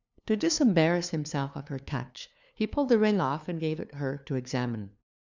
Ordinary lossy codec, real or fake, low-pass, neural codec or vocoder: Opus, 64 kbps; fake; 7.2 kHz; codec, 16 kHz, 2 kbps, FunCodec, trained on LibriTTS, 25 frames a second